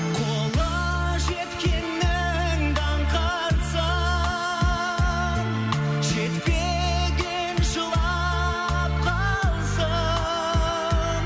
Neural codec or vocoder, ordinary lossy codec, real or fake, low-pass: none; none; real; none